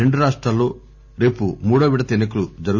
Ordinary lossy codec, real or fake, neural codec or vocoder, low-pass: none; real; none; 7.2 kHz